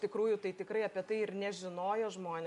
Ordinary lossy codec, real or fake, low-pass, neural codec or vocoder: AAC, 96 kbps; real; 10.8 kHz; none